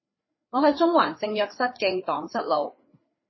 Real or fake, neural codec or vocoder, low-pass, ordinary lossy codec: fake; codec, 16 kHz, 4 kbps, FreqCodec, larger model; 7.2 kHz; MP3, 24 kbps